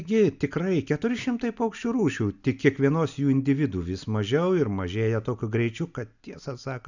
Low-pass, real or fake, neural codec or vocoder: 7.2 kHz; real; none